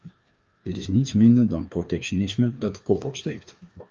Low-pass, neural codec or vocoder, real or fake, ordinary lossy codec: 7.2 kHz; codec, 16 kHz, 2 kbps, FreqCodec, larger model; fake; Opus, 24 kbps